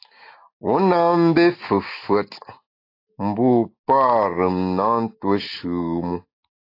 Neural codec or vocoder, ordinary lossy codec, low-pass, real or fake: none; AAC, 32 kbps; 5.4 kHz; real